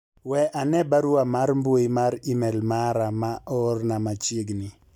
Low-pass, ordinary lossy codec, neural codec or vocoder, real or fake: 19.8 kHz; none; none; real